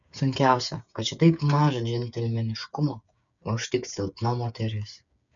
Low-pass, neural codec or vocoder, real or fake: 7.2 kHz; codec, 16 kHz, 8 kbps, FreqCodec, smaller model; fake